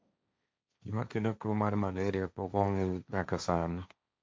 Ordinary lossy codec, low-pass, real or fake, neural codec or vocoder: none; none; fake; codec, 16 kHz, 1.1 kbps, Voila-Tokenizer